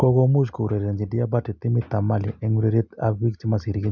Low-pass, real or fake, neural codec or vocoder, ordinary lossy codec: 7.2 kHz; real; none; none